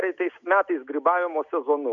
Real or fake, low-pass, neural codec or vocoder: real; 7.2 kHz; none